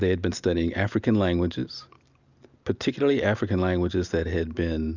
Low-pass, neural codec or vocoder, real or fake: 7.2 kHz; none; real